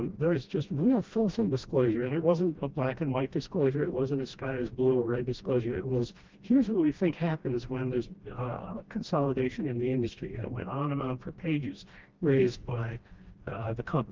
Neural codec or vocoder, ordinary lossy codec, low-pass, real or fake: codec, 16 kHz, 1 kbps, FreqCodec, smaller model; Opus, 32 kbps; 7.2 kHz; fake